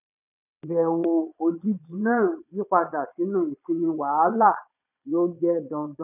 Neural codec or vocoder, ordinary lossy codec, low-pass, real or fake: vocoder, 22.05 kHz, 80 mel bands, Vocos; none; 3.6 kHz; fake